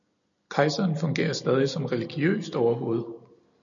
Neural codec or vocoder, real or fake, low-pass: none; real; 7.2 kHz